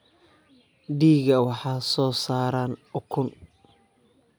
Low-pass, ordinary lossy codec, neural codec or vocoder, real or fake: none; none; none; real